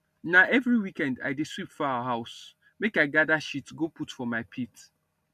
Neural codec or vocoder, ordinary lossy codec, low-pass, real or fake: none; none; 14.4 kHz; real